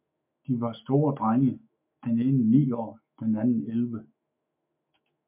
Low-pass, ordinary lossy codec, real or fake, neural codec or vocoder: 3.6 kHz; MP3, 32 kbps; fake; autoencoder, 48 kHz, 128 numbers a frame, DAC-VAE, trained on Japanese speech